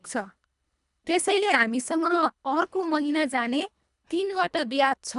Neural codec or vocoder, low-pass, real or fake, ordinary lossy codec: codec, 24 kHz, 1.5 kbps, HILCodec; 10.8 kHz; fake; none